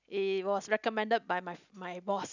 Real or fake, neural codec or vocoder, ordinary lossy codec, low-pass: real; none; none; 7.2 kHz